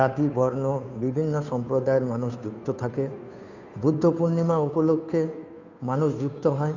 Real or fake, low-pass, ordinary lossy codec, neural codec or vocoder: fake; 7.2 kHz; none; codec, 16 kHz, 2 kbps, FunCodec, trained on Chinese and English, 25 frames a second